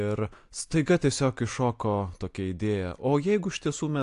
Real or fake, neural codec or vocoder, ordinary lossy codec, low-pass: real; none; AAC, 64 kbps; 10.8 kHz